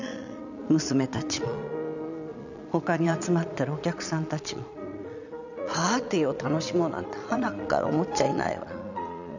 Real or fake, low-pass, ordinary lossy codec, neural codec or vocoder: fake; 7.2 kHz; none; vocoder, 22.05 kHz, 80 mel bands, Vocos